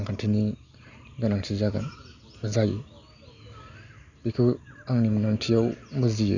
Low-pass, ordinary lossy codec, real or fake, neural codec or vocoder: 7.2 kHz; none; real; none